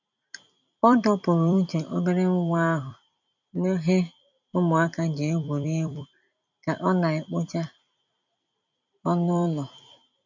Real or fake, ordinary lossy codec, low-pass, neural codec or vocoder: fake; none; 7.2 kHz; vocoder, 44.1 kHz, 128 mel bands every 256 samples, BigVGAN v2